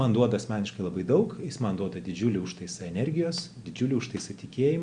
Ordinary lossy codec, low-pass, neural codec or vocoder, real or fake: Opus, 64 kbps; 9.9 kHz; none; real